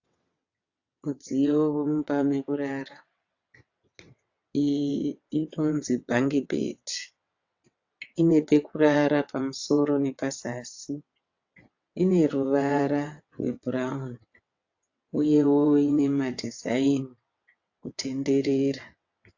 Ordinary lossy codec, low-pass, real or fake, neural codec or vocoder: AAC, 48 kbps; 7.2 kHz; fake; vocoder, 22.05 kHz, 80 mel bands, WaveNeXt